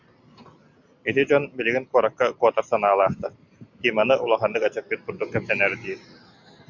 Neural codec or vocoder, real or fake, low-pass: none; real; 7.2 kHz